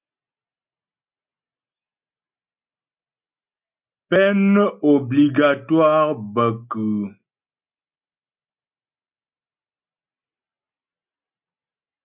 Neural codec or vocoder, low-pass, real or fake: none; 3.6 kHz; real